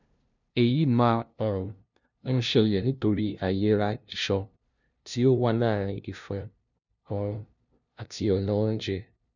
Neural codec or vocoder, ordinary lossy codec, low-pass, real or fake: codec, 16 kHz, 0.5 kbps, FunCodec, trained on LibriTTS, 25 frames a second; none; 7.2 kHz; fake